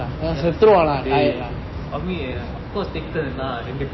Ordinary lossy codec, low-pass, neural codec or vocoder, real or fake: MP3, 24 kbps; 7.2 kHz; none; real